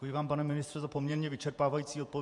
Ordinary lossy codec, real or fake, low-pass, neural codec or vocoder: MP3, 64 kbps; fake; 10.8 kHz; vocoder, 48 kHz, 128 mel bands, Vocos